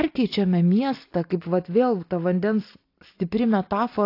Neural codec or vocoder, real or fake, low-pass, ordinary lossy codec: none; real; 5.4 kHz; AAC, 32 kbps